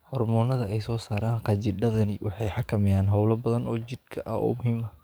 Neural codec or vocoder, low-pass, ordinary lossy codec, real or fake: codec, 44.1 kHz, 7.8 kbps, DAC; none; none; fake